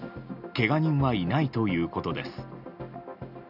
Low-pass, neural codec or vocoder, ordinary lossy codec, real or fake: 5.4 kHz; none; none; real